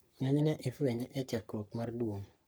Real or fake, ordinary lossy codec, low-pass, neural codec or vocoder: fake; none; none; codec, 44.1 kHz, 3.4 kbps, Pupu-Codec